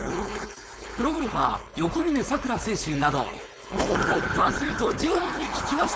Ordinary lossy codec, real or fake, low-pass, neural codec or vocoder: none; fake; none; codec, 16 kHz, 4.8 kbps, FACodec